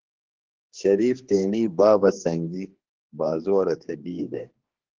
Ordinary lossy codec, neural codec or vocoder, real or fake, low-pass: Opus, 16 kbps; codec, 16 kHz, 2 kbps, X-Codec, HuBERT features, trained on general audio; fake; 7.2 kHz